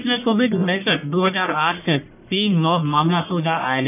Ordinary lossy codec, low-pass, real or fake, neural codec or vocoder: none; 3.6 kHz; fake; codec, 44.1 kHz, 1.7 kbps, Pupu-Codec